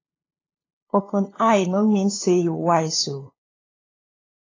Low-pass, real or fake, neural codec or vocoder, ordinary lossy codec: 7.2 kHz; fake; codec, 16 kHz, 2 kbps, FunCodec, trained on LibriTTS, 25 frames a second; AAC, 32 kbps